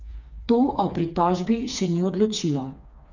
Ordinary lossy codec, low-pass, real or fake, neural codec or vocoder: none; 7.2 kHz; fake; codec, 16 kHz, 4 kbps, FreqCodec, smaller model